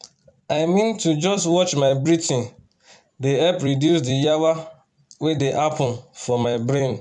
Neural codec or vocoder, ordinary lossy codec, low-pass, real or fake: vocoder, 44.1 kHz, 128 mel bands every 256 samples, BigVGAN v2; none; 10.8 kHz; fake